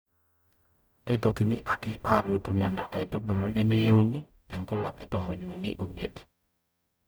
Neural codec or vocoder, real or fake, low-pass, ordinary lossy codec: codec, 44.1 kHz, 0.9 kbps, DAC; fake; none; none